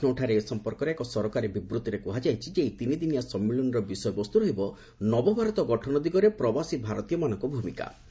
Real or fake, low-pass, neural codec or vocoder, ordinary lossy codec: real; none; none; none